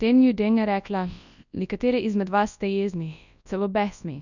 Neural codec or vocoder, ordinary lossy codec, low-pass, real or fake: codec, 24 kHz, 0.9 kbps, WavTokenizer, large speech release; none; 7.2 kHz; fake